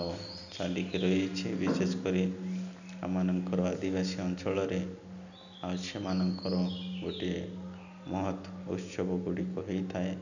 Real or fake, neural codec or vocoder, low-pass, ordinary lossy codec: real; none; 7.2 kHz; none